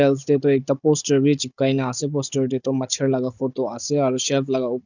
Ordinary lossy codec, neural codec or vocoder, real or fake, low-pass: none; codec, 16 kHz, 16 kbps, FunCodec, trained on Chinese and English, 50 frames a second; fake; 7.2 kHz